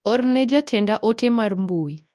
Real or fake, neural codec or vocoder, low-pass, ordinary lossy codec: fake; codec, 24 kHz, 0.9 kbps, WavTokenizer, large speech release; none; none